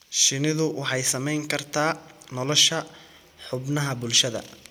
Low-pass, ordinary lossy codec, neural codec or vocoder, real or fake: none; none; none; real